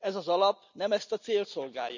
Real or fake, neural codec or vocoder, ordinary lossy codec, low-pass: real; none; none; 7.2 kHz